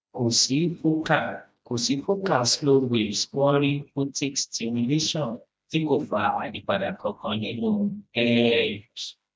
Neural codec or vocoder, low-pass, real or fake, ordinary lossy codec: codec, 16 kHz, 1 kbps, FreqCodec, smaller model; none; fake; none